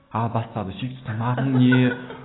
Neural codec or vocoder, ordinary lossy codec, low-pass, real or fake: none; AAC, 16 kbps; 7.2 kHz; real